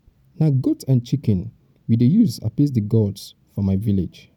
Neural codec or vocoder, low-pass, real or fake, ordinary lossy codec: none; 19.8 kHz; real; none